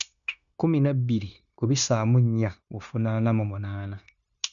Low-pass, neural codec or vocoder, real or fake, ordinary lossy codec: 7.2 kHz; codec, 16 kHz, 0.9 kbps, LongCat-Audio-Codec; fake; none